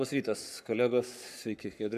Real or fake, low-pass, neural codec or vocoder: fake; 14.4 kHz; codec, 44.1 kHz, 7.8 kbps, Pupu-Codec